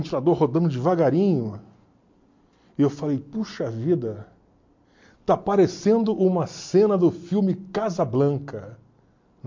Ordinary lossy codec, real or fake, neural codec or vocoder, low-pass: MP3, 48 kbps; real; none; 7.2 kHz